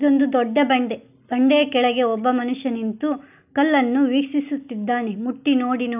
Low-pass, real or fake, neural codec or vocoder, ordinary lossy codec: 3.6 kHz; real; none; none